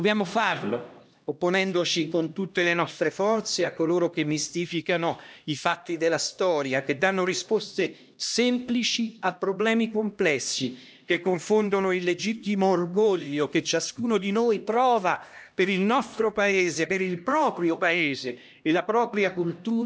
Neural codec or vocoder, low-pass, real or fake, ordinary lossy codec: codec, 16 kHz, 1 kbps, X-Codec, HuBERT features, trained on LibriSpeech; none; fake; none